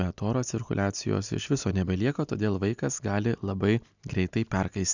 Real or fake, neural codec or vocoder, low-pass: real; none; 7.2 kHz